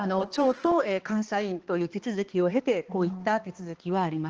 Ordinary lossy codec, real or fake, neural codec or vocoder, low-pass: Opus, 16 kbps; fake; codec, 16 kHz, 2 kbps, X-Codec, HuBERT features, trained on balanced general audio; 7.2 kHz